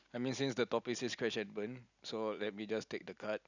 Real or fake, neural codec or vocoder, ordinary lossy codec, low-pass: real; none; none; 7.2 kHz